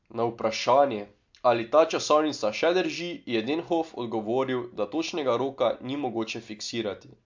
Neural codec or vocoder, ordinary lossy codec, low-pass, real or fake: none; MP3, 64 kbps; 7.2 kHz; real